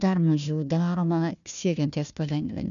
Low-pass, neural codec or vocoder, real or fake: 7.2 kHz; codec, 16 kHz, 1 kbps, FunCodec, trained on Chinese and English, 50 frames a second; fake